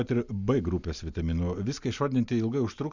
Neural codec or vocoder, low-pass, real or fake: none; 7.2 kHz; real